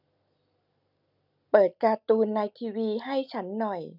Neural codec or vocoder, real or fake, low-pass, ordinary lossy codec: none; real; 5.4 kHz; none